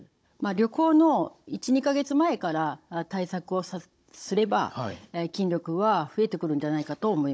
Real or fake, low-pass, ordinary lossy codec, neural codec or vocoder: fake; none; none; codec, 16 kHz, 16 kbps, FunCodec, trained on Chinese and English, 50 frames a second